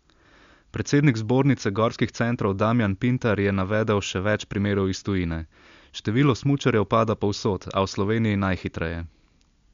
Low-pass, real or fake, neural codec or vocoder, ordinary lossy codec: 7.2 kHz; real; none; MP3, 64 kbps